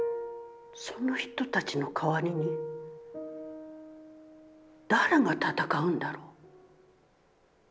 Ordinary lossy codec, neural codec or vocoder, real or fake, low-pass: none; none; real; none